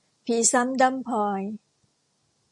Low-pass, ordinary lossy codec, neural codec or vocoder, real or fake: 10.8 kHz; MP3, 48 kbps; none; real